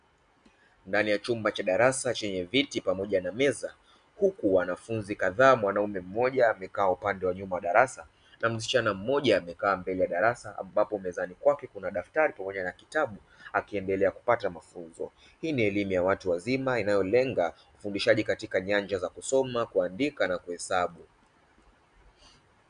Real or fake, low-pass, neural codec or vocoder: real; 9.9 kHz; none